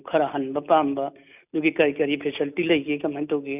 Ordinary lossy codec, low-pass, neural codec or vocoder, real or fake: none; 3.6 kHz; none; real